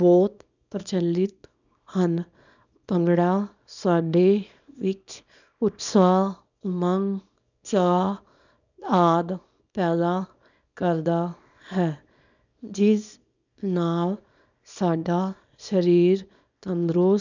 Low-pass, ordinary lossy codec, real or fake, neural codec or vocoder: 7.2 kHz; none; fake; codec, 24 kHz, 0.9 kbps, WavTokenizer, small release